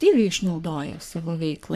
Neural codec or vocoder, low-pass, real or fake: codec, 44.1 kHz, 3.4 kbps, Pupu-Codec; 14.4 kHz; fake